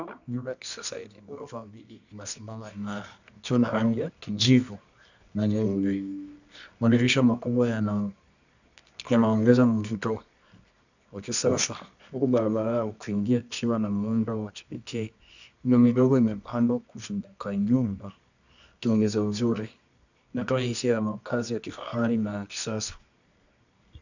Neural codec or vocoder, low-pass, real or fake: codec, 24 kHz, 0.9 kbps, WavTokenizer, medium music audio release; 7.2 kHz; fake